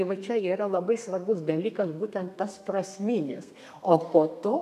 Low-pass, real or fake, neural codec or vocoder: 14.4 kHz; fake; codec, 32 kHz, 1.9 kbps, SNAC